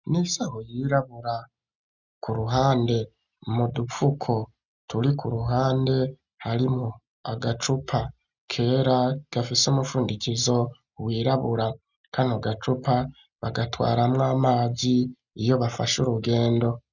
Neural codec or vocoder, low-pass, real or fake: none; 7.2 kHz; real